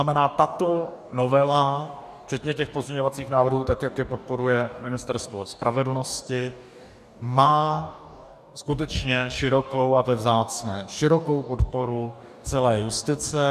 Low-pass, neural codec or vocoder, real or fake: 14.4 kHz; codec, 44.1 kHz, 2.6 kbps, DAC; fake